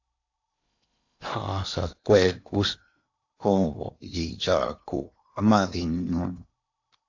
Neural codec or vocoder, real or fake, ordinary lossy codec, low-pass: codec, 16 kHz in and 24 kHz out, 0.8 kbps, FocalCodec, streaming, 65536 codes; fake; AAC, 48 kbps; 7.2 kHz